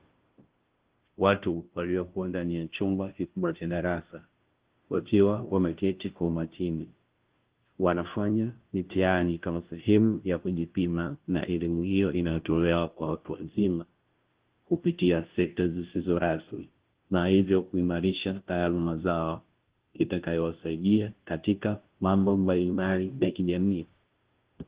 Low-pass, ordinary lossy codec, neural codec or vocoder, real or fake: 3.6 kHz; Opus, 16 kbps; codec, 16 kHz, 0.5 kbps, FunCodec, trained on Chinese and English, 25 frames a second; fake